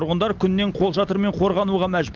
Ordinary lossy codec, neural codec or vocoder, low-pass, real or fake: Opus, 32 kbps; none; 7.2 kHz; real